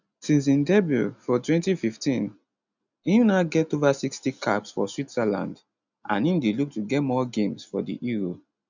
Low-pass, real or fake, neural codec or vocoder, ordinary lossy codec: 7.2 kHz; real; none; none